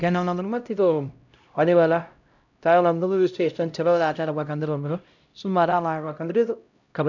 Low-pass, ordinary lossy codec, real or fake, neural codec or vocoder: 7.2 kHz; none; fake; codec, 16 kHz, 0.5 kbps, X-Codec, HuBERT features, trained on LibriSpeech